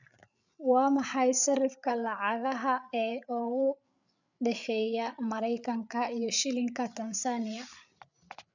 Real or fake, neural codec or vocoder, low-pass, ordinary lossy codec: fake; codec, 16 kHz, 8 kbps, FreqCodec, larger model; 7.2 kHz; none